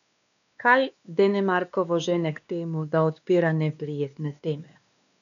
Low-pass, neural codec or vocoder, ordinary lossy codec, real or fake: 7.2 kHz; codec, 16 kHz, 2 kbps, X-Codec, WavLM features, trained on Multilingual LibriSpeech; none; fake